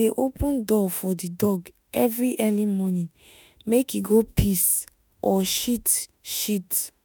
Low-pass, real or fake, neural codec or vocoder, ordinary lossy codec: none; fake; autoencoder, 48 kHz, 32 numbers a frame, DAC-VAE, trained on Japanese speech; none